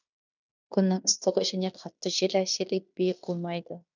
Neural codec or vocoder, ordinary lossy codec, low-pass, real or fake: codec, 16 kHz in and 24 kHz out, 0.9 kbps, LongCat-Audio-Codec, fine tuned four codebook decoder; none; 7.2 kHz; fake